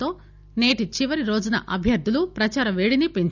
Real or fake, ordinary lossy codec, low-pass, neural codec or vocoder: real; none; 7.2 kHz; none